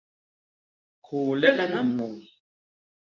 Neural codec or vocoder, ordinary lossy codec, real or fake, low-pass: codec, 24 kHz, 0.9 kbps, WavTokenizer, medium speech release version 2; MP3, 64 kbps; fake; 7.2 kHz